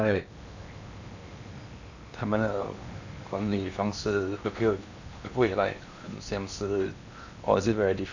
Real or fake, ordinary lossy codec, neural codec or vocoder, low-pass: fake; none; codec, 16 kHz in and 24 kHz out, 0.8 kbps, FocalCodec, streaming, 65536 codes; 7.2 kHz